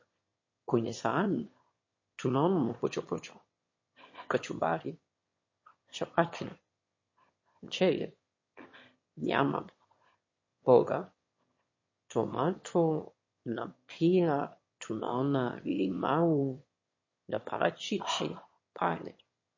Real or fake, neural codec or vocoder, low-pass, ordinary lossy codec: fake; autoencoder, 22.05 kHz, a latent of 192 numbers a frame, VITS, trained on one speaker; 7.2 kHz; MP3, 32 kbps